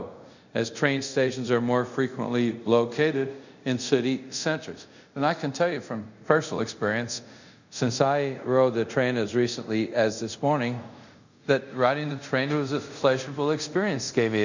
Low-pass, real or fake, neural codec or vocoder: 7.2 kHz; fake; codec, 24 kHz, 0.5 kbps, DualCodec